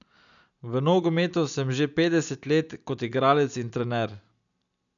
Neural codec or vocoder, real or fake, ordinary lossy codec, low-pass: none; real; none; 7.2 kHz